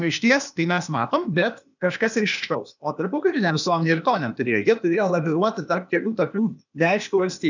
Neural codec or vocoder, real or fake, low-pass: codec, 16 kHz, 0.8 kbps, ZipCodec; fake; 7.2 kHz